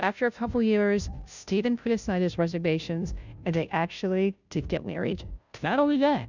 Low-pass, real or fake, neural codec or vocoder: 7.2 kHz; fake; codec, 16 kHz, 0.5 kbps, FunCodec, trained on Chinese and English, 25 frames a second